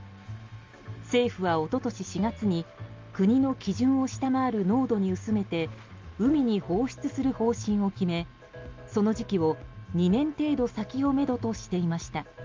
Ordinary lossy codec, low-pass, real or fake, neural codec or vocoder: Opus, 32 kbps; 7.2 kHz; real; none